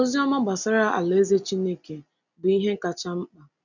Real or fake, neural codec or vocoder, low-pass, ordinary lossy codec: real; none; 7.2 kHz; none